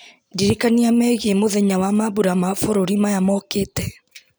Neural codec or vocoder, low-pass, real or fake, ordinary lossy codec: none; none; real; none